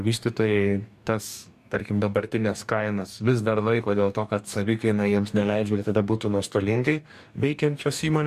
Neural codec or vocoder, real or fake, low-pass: codec, 44.1 kHz, 2.6 kbps, DAC; fake; 14.4 kHz